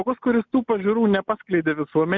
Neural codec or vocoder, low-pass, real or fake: none; 7.2 kHz; real